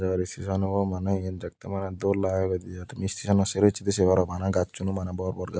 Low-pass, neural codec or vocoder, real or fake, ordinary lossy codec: none; none; real; none